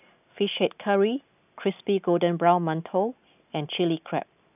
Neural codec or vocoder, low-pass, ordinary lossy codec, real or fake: none; 3.6 kHz; none; real